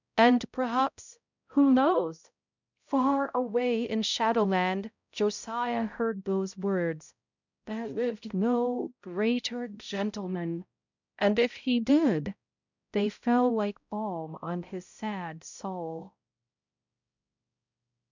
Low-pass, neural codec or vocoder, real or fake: 7.2 kHz; codec, 16 kHz, 0.5 kbps, X-Codec, HuBERT features, trained on balanced general audio; fake